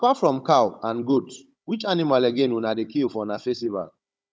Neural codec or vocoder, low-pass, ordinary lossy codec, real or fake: codec, 16 kHz, 16 kbps, FunCodec, trained on Chinese and English, 50 frames a second; none; none; fake